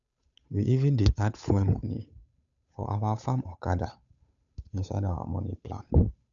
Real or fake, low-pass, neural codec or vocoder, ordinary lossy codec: fake; 7.2 kHz; codec, 16 kHz, 8 kbps, FunCodec, trained on Chinese and English, 25 frames a second; none